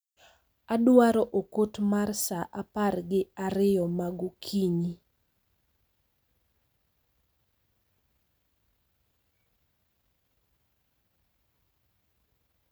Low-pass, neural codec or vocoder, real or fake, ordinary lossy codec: none; none; real; none